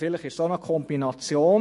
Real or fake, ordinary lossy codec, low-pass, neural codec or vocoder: fake; MP3, 48 kbps; 14.4 kHz; autoencoder, 48 kHz, 128 numbers a frame, DAC-VAE, trained on Japanese speech